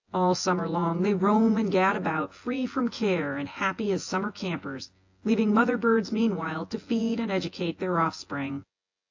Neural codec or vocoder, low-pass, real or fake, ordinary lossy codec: vocoder, 24 kHz, 100 mel bands, Vocos; 7.2 kHz; fake; AAC, 48 kbps